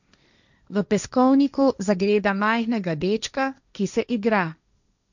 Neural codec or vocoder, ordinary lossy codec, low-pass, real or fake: codec, 16 kHz, 1.1 kbps, Voila-Tokenizer; none; 7.2 kHz; fake